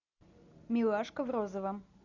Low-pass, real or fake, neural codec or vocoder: 7.2 kHz; real; none